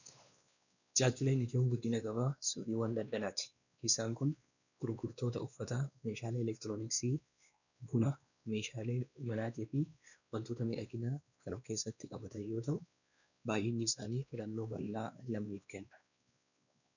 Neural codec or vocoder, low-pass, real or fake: codec, 16 kHz, 2 kbps, X-Codec, WavLM features, trained on Multilingual LibriSpeech; 7.2 kHz; fake